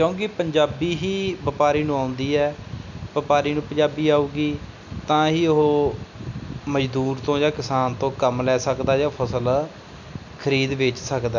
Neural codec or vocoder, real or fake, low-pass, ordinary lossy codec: none; real; 7.2 kHz; none